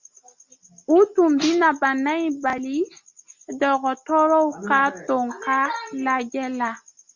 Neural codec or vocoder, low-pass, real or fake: none; 7.2 kHz; real